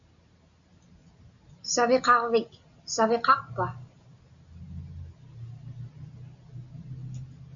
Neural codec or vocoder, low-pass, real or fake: none; 7.2 kHz; real